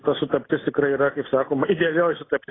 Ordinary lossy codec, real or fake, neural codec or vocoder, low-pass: AAC, 16 kbps; real; none; 7.2 kHz